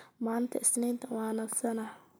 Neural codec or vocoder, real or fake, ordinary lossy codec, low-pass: none; real; none; none